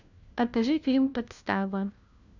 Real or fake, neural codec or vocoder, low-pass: fake; codec, 16 kHz, 1 kbps, FunCodec, trained on LibriTTS, 50 frames a second; 7.2 kHz